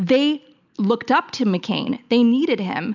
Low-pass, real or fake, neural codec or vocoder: 7.2 kHz; real; none